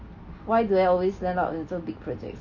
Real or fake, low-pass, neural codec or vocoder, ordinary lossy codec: real; 7.2 kHz; none; none